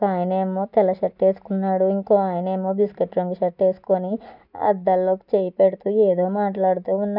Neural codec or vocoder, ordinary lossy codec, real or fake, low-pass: none; none; real; 5.4 kHz